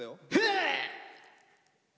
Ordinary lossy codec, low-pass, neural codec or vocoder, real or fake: none; none; none; real